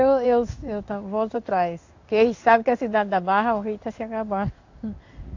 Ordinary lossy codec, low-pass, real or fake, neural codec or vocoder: AAC, 48 kbps; 7.2 kHz; fake; codec, 16 kHz in and 24 kHz out, 1 kbps, XY-Tokenizer